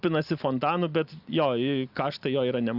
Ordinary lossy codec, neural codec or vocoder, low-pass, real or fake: Opus, 64 kbps; none; 5.4 kHz; real